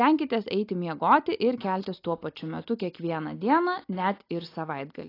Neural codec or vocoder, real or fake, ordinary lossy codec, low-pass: none; real; AAC, 32 kbps; 5.4 kHz